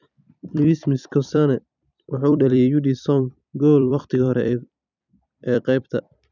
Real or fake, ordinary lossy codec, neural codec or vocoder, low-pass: fake; none; vocoder, 44.1 kHz, 128 mel bands every 256 samples, BigVGAN v2; 7.2 kHz